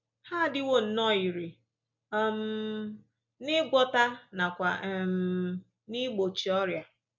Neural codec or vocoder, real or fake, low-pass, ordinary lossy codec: none; real; 7.2 kHz; MP3, 64 kbps